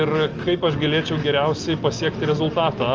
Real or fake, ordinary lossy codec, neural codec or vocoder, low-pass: real; Opus, 24 kbps; none; 7.2 kHz